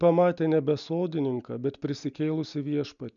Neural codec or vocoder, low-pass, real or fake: none; 7.2 kHz; real